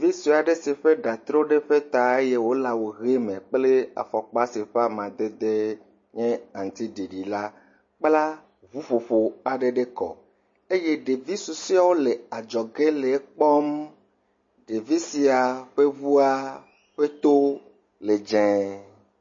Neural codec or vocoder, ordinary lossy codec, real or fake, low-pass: none; MP3, 32 kbps; real; 7.2 kHz